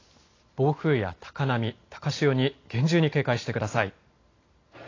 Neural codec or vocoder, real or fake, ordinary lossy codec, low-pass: none; real; AAC, 32 kbps; 7.2 kHz